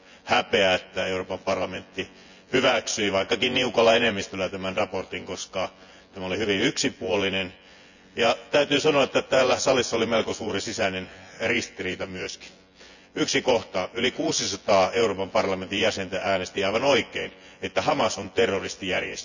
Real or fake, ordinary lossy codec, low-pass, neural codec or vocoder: fake; none; 7.2 kHz; vocoder, 24 kHz, 100 mel bands, Vocos